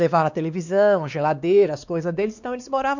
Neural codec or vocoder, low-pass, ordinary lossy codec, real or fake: codec, 16 kHz, 2 kbps, X-Codec, WavLM features, trained on Multilingual LibriSpeech; 7.2 kHz; none; fake